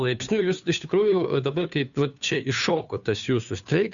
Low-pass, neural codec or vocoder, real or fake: 7.2 kHz; codec, 16 kHz, 2 kbps, FunCodec, trained on Chinese and English, 25 frames a second; fake